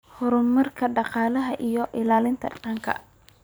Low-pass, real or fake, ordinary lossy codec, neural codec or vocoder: none; fake; none; vocoder, 44.1 kHz, 128 mel bands every 256 samples, BigVGAN v2